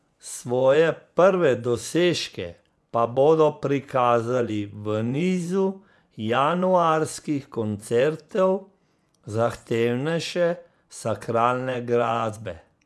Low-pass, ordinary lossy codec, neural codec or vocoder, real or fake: none; none; vocoder, 24 kHz, 100 mel bands, Vocos; fake